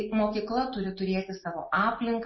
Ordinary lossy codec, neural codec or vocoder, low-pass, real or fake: MP3, 24 kbps; none; 7.2 kHz; real